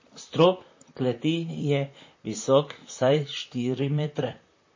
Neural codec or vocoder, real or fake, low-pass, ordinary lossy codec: vocoder, 44.1 kHz, 128 mel bands, Pupu-Vocoder; fake; 7.2 kHz; MP3, 32 kbps